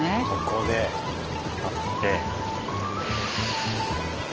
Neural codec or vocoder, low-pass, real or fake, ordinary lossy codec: none; 7.2 kHz; real; Opus, 16 kbps